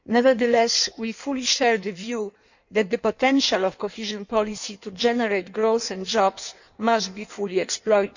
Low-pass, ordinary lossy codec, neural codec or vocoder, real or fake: 7.2 kHz; none; codec, 16 kHz in and 24 kHz out, 1.1 kbps, FireRedTTS-2 codec; fake